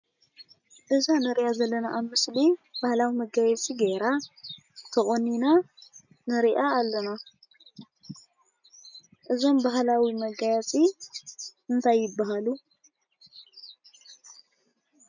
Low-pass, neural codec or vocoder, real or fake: 7.2 kHz; none; real